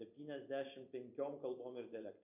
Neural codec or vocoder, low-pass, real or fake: vocoder, 44.1 kHz, 128 mel bands every 512 samples, BigVGAN v2; 3.6 kHz; fake